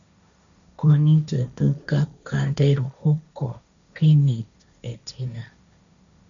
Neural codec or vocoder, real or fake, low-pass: codec, 16 kHz, 1.1 kbps, Voila-Tokenizer; fake; 7.2 kHz